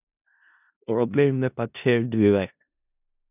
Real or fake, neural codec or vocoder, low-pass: fake; codec, 16 kHz in and 24 kHz out, 0.4 kbps, LongCat-Audio-Codec, four codebook decoder; 3.6 kHz